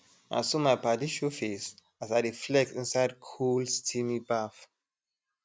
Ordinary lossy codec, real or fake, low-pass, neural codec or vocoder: none; real; none; none